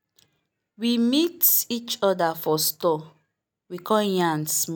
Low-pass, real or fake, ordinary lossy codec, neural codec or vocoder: none; real; none; none